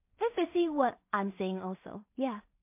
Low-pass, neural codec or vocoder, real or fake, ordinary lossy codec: 3.6 kHz; codec, 16 kHz in and 24 kHz out, 0.4 kbps, LongCat-Audio-Codec, two codebook decoder; fake; MP3, 32 kbps